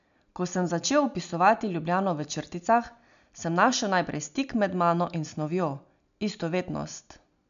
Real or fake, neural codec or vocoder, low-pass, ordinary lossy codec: real; none; 7.2 kHz; none